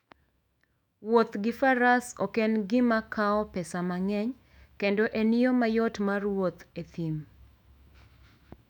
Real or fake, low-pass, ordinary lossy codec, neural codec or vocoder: fake; 19.8 kHz; none; autoencoder, 48 kHz, 128 numbers a frame, DAC-VAE, trained on Japanese speech